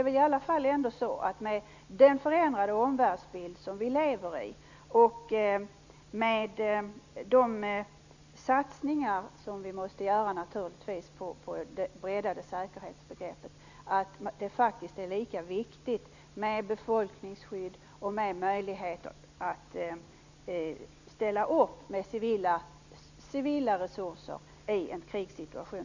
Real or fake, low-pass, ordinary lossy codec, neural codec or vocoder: real; 7.2 kHz; Opus, 64 kbps; none